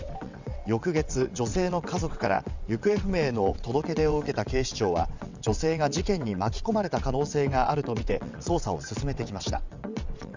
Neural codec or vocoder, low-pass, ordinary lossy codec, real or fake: vocoder, 22.05 kHz, 80 mel bands, WaveNeXt; 7.2 kHz; Opus, 64 kbps; fake